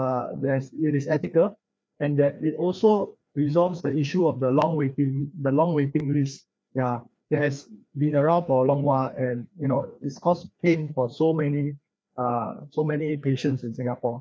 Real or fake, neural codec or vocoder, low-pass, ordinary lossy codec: fake; codec, 16 kHz, 2 kbps, FreqCodec, larger model; none; none